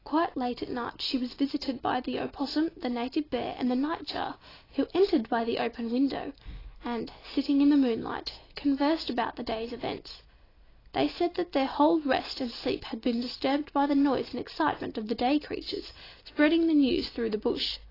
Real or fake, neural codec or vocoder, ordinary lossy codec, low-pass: real; none; AAC, 24 kbps; 5.4 kHz